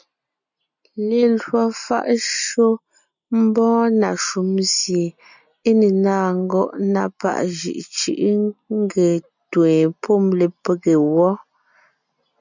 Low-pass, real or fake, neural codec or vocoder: 7.2 kHz; real; none